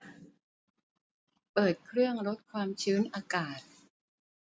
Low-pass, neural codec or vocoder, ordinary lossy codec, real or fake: none; none; none; real